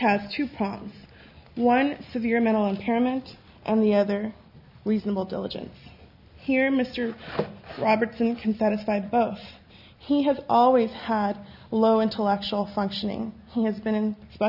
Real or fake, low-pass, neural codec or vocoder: real; 5.4 kHz; none